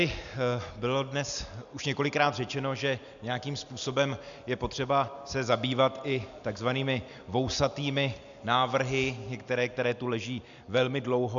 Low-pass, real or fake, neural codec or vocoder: 7.2 kHz; real; none